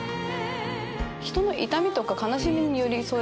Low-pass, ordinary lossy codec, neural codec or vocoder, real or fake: none; none; none; real